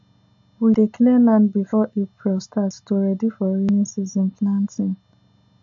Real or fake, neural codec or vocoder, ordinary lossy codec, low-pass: real; none; AAC, 64 kbps; 7.2 kHz